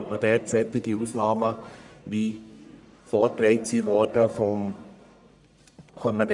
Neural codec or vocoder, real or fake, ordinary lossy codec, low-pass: codec, 44.1 kHz, 1.7 kbps, Pupu-Codec; fake; none; 10.8 kHz